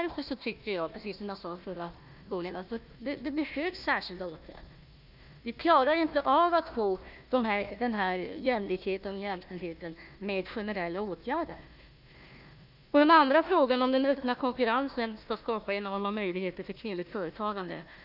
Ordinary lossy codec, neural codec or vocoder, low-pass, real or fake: none; codec, 16 kHz, 1 kbps, FunCodec, trained on Chinese and English, 50 frames a second; 5.4 kHz; fake